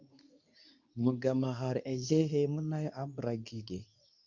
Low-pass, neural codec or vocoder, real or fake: 7.2 kHz; codec, 24 kHz, 0.9 kbps, WavTokenizer, medium speech release version 2; fake